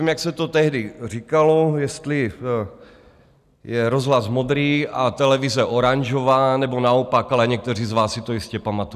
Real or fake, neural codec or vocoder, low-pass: real; none; 14.4 kHz